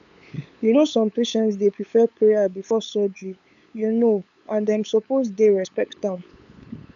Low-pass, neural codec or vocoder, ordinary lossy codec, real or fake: 7.2 kHz; codec, 16 kHz, 8 kbps, FunCodec, trained on LibriTTS, 25 frames a second; none; fake